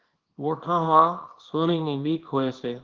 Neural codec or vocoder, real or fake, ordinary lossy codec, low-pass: codec, 24 kHz, 0.9 kbps, WavTokenizer, small release; fake; Opus, 16 kbps; 7.2 kHz